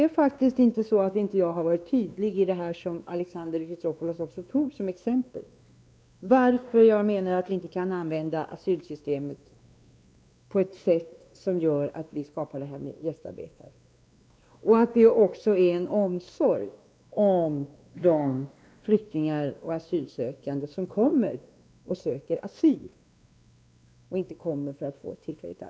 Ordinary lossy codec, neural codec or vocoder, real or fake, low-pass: none; codec, 16 kHz, 2 kbps, X-Codec, WavLM features, trained on Multilingual LibriSpeech; fake; none